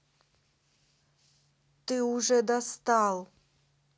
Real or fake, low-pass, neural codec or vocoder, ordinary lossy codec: real; none; none; none